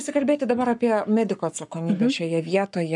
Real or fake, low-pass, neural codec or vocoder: fake; 10.8 kHz; codec, 44.1 kHz, 7.8 kbps, Pupu-Codec